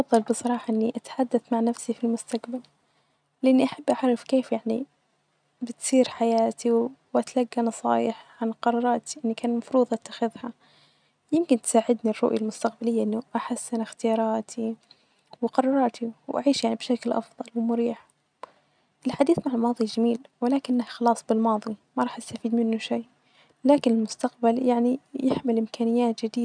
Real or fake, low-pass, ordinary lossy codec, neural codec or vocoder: real; 9.9 kHz; none; none